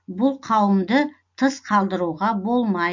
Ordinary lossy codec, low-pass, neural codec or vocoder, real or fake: MP3, 48 kbps; 7.2 kHz; none; real